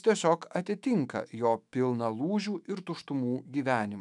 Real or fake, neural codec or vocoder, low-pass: fake; autoencoder, 48 kHz, 128 numbers a frame, DAC-VAE, trained on Japanese speech; 10.8 kHz